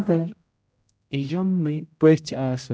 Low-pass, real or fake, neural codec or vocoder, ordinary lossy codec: none; fake; codec, 16 kHz, 0.5 kbps, X-Codec, HuBERT features, trained on general audio; none